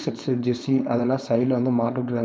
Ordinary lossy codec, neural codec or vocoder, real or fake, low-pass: none; codec, 16 kHz, 4.8 kbps, FACodec; fake; none